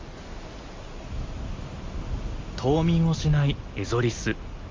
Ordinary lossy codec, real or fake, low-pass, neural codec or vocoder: Opus, 32 kbps; real; 7.2 kHz; none